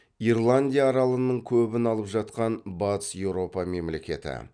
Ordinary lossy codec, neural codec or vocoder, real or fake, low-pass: none; none; real; 9.9 kHz